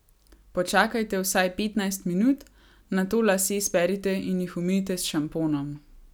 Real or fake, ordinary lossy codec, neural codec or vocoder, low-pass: real; none; none; none